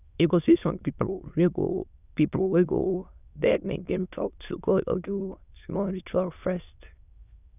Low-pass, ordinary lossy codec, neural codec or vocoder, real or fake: 3.6 kHz; none; autoencoder, 22.05 kHz, a latent of 192 numbers a frame, VITS, trained on many speakers; fake